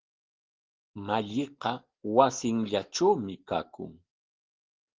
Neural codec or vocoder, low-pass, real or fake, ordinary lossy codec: none; 7.2 kHz; real; Opus, 16 kbps